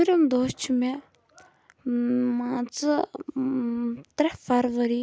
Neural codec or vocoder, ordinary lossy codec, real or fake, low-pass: none; none; real; none